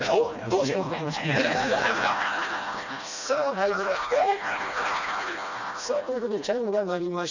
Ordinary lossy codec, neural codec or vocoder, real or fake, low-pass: none; codec, 16 kHz, 1 kbps, FreqCodec, smaller model; fake; 7.2 kHz